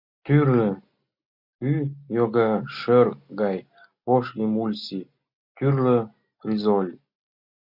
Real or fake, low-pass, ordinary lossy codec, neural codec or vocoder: real; 5.4 kHz; MP3, 48 kbps; none